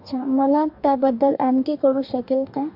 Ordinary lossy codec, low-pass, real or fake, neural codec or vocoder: MP3, 48 kbps; 5.4 kHz; fake; codec, 44.1 kHz, 2.6 kbps, DAC